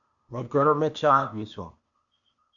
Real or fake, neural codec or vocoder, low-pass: fake; codec, 16 kHz, 0.8 kbps, ZipCodec; 7.2 kHz